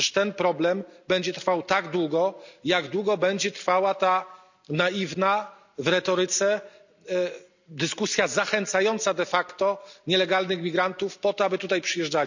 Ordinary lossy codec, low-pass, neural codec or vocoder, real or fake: none; 7.2 kHz; none; real